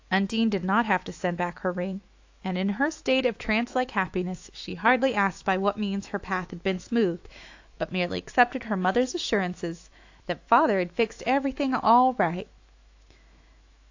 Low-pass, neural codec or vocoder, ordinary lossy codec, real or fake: 7.2 kHz; autoencoder, 48 kHz, 128 numbers a frame, DAC-VAE, trained on Japanese speech; AAC, 48 kbps; fake